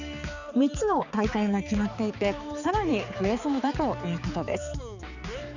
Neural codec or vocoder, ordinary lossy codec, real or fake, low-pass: codec, 16 kHz, 4 kbps, X-Codec, HuBERT features, trained on balanced general audio; none; fake; 7.2 kHz